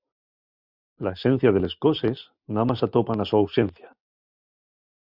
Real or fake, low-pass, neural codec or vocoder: real; 5.4 kHz; none